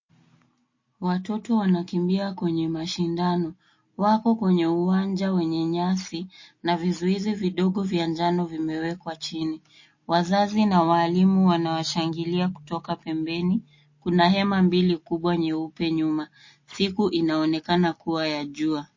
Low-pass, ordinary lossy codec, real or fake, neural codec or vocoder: 7.2 kHz; MP3, 32 kbps; real; none